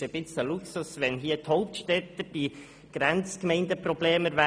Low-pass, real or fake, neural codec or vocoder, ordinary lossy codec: none; real; none; none